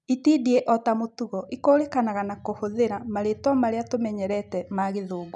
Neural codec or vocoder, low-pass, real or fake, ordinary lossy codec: none; 10.8 kHz; real; none